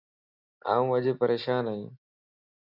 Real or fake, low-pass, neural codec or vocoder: real; 5.4 kHz; none